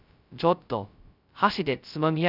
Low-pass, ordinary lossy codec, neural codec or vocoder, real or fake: 5.4 kHz; none; codec, 16 kHz, 0.2 kbps, FocalCodec; fake